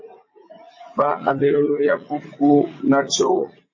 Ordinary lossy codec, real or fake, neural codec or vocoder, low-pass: MP3, 32 kbps; fake; vocoder, 44.1 kHz, 80 mel bands, Vocos; 7.2 kHz